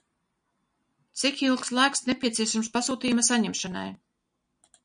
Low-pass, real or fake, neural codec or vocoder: 9.9 kHz; real; none